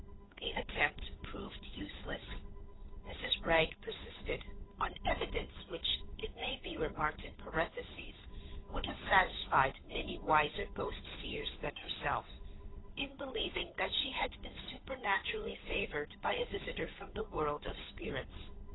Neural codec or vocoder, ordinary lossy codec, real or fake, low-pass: codec, 16 kHz, 8 kbps, FunCodec, trained on Chinese and English, 25 frames a second; AAC, 16 kbps; fake; 7.2 kHz